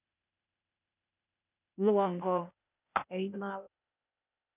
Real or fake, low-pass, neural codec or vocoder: fake; 3.6 kHz; codec, 16 kHz, 0.8 kbps, ZipCodec